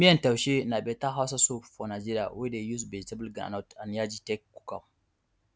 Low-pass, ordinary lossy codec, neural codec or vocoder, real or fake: none; none; none; real